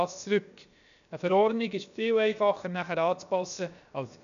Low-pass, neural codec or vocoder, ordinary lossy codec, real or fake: 7.2 kHz; codec, 16 kHz, about 1 kbps, DyCAST, with the encoder's durations; none; fake